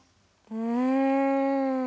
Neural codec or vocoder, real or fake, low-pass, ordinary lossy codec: none; real; none; none